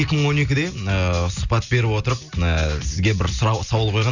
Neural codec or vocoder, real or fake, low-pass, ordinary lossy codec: none; real; 7.2 kHz; none